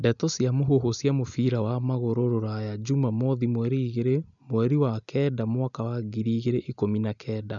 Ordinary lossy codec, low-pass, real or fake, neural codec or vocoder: MP3, 96 kbps; 7.2 kHz; real; none